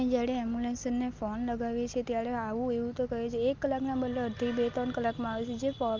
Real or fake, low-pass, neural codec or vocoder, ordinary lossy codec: real; 7.2 kHz; none; Opus, 32 kbps